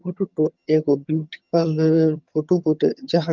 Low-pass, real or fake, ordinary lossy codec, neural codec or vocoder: 7.2 kHz; fake; Opus, 24 kbps; vocoder, 22.05 kHz, 80 mel bands, HiFi-GAN